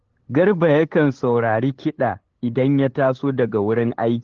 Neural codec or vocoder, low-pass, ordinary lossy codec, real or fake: codec, 16 kHz, 8 kbps, FunCodec, trained on LibriTTS, 25 frames a second; 7.2 kHz; Opus, 16 kbps; fake